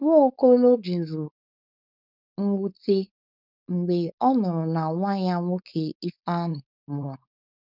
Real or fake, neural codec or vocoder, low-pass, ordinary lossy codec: fake; codec, 16 kHz, 4.8 kbps, FACodec; 5.4 kHz; none